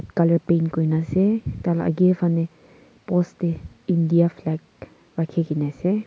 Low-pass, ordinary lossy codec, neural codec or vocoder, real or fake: none; none; none; real